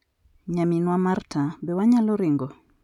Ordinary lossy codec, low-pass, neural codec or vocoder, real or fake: none; 19.8 kHz; none; real